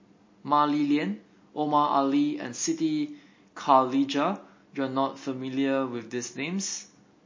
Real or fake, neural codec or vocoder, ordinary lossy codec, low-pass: real; none; MP3, 32 kbps; 7.2 kHz